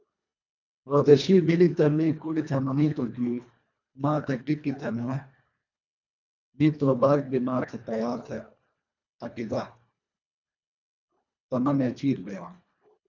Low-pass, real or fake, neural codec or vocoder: 7.2 kHz; fake; codec, 24 kHz, 1.5 kbps, HILCodec